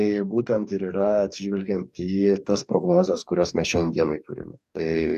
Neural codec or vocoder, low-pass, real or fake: codec, 44.1 kHz, 2.6 kbps, DAC; 14.4 kHz; fake